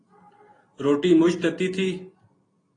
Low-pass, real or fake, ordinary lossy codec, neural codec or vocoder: 9.9 kHz; real; AAC, 32 kbps; none